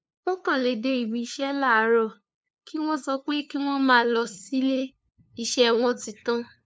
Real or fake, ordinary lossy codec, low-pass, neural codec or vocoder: fake; none; none; codec, 16 kHz, 2 kbps, FunCodec, trained on LibriTTS, 25 frames a second